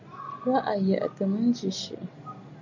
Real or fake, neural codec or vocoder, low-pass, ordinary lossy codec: real; none; 7.2 kHz; MP3, 64 kbps